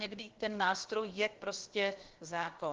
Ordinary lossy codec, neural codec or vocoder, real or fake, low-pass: Opus, 16 kbps; codec, 16 kHz, about 1 kbps, DyCAST, with the encoder's durations; fake; 7.2 kHz